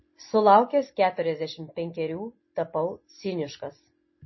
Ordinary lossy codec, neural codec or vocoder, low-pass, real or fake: MP3, 24 kbps; none; 7.2 kHz; real